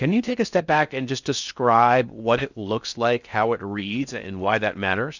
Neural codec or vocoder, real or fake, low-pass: codec, 16 kHz in and 24 kHz out, 0.6 kbps, FocalCodec, streaming, 4096 codes; fake; 7.2 kHz